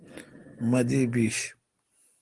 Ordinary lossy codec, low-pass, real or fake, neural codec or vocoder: Opus, 24 kbps; 10.8 kHz; real; none